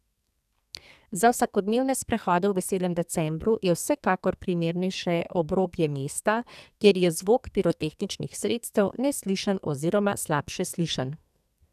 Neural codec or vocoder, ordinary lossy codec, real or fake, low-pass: codec, 44.1 kHz, 2.6 kbps, SNAC; none; fake; 14.4 kHz